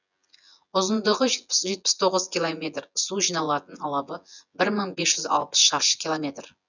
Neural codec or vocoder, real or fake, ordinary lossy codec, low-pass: vocoder, 24 kHz, 100 mel bands, Vocos; fake; none; 7.2 kHz